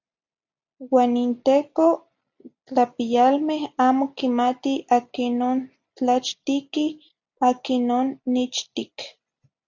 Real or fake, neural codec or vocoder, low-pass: real; none; 7.2 kHz